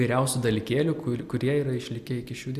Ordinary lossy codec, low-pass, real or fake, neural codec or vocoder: Opus, 64 kbps; 14.4 kHz; real; none